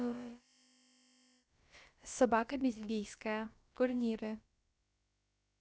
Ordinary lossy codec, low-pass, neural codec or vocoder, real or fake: none; none; codec, 16 kHz, about 1 kbps, DyCAST, with the encoder's durations; fake